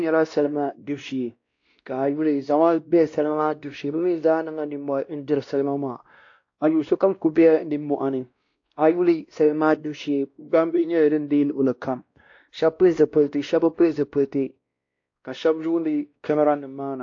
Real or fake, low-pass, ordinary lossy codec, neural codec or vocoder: fake; 7.2 kHz; AAC, 48 kbps; codec, 16 kHz, 1 kbps, X-Codec, WavLM features, trained on Multilingual LibriSpeech